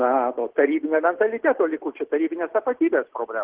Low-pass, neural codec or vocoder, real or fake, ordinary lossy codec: 3.6 kHz; none; real; Opus, 16 kbps